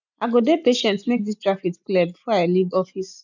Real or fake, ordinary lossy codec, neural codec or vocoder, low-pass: real; none; none; 7.2 kHz